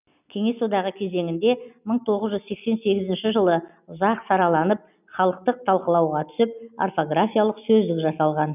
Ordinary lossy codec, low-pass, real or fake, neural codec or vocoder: none; 3.6 kHz; real; none